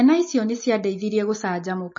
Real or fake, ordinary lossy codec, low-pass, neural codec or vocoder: real; MP3, 32 kbps; 7.2 kHz; none